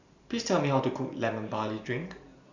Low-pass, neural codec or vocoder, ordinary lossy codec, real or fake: 7.2 kHz; vocoder, 44.1 kHz, 128 mel bands every 512 samples, BigVGAN v2; none; fake